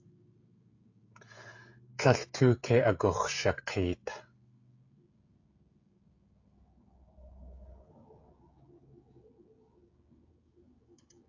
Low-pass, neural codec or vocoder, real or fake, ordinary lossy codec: 7.2 kHz; codec, 16 kHz, 16 kbps, FreqCodec, smaller model; fake; AAC, 48 kbps